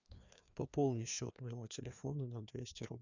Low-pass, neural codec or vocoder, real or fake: 7.2 kHz; codec, 16 kHz, 2 kbps, FreqCodec, larger model; fake